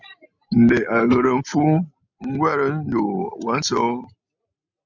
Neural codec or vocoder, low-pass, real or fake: none; 7.2 kHz; real